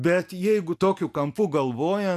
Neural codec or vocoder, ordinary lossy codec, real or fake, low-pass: none; AAC, 96 kbps; real; 14.4 kHz